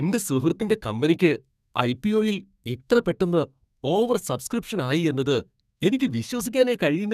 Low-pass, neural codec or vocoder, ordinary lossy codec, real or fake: 14.4 kHz; codec, 32 kHz, 1.9 kbps, SNAC; none; fake